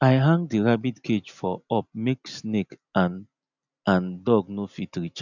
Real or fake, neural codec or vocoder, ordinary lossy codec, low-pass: real; none; none; 7.2 kHz